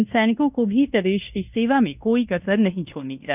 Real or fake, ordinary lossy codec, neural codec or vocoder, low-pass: fake; none; codec, 16 kHz in and 24 kHz out, 0.9 kbps, LongCat-Audio-Codec, fine tuned four codebook decoder; 3.6 kHz